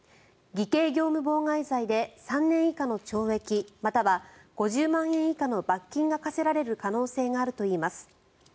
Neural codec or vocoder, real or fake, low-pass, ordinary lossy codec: none; real; none; none